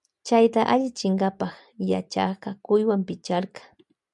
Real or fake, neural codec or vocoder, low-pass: real; none; 10.8 kHz